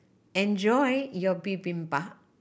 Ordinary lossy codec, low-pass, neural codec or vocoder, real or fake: none; none; none; real